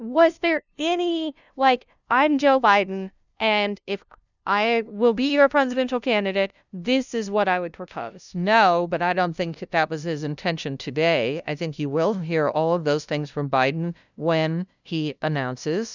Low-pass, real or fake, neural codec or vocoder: 7.2 kHz; fake; codec, 16 kHz, 0.5 kbps, FunCodec, trained on LibriTTS, 25 frames a second